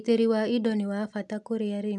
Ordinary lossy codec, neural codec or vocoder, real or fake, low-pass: none; none; real; none